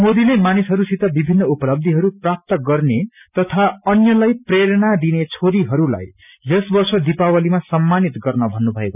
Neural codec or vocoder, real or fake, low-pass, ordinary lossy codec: none; real; 3.6 kHz; none